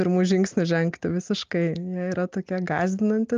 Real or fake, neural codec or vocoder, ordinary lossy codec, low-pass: real; none; Opus, 24 kbps; 7.2 kHz